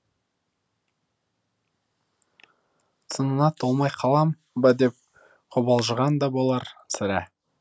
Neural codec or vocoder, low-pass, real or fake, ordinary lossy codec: none; none; real; none